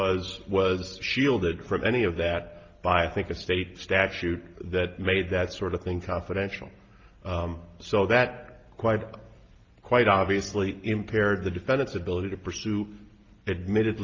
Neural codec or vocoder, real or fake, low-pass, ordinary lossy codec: none; real; 7.2 kHz; Opus, 24 kbps